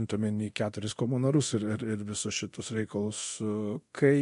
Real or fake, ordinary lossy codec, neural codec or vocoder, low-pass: fake; MP3, 48 kbps; codec, 24 kHz, 0.9 kbps, DualCodec; 10.8 kHz